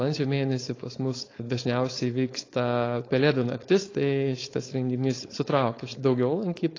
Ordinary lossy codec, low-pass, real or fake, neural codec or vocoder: AAC, 32 kbps; 7.2 kHz; fake; codec, 16 kHz, 4.8 kbps, FACodec